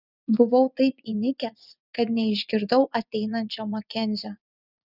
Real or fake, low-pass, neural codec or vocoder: fake; 5.4 kHz; vocoder, 24 kHz, 100 mel bands, Vocos